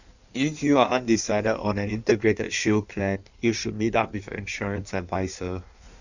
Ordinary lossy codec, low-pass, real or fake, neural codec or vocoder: none; 7.2 kHz; fake; codec, 16 kHz in and 24 kHz out, 1.1 kbps, FireRedTTS-2 codec